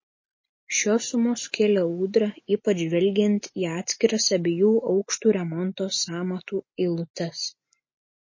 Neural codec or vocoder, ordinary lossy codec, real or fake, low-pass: none; MP3, 32 kbps; real; 7.2 kHz